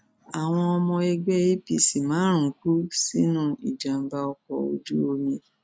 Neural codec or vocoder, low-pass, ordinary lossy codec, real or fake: none; none; none; real